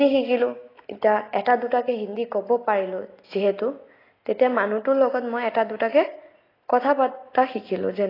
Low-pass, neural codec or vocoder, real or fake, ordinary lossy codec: 5.4 kHz; none; real; AAC, 24 kbps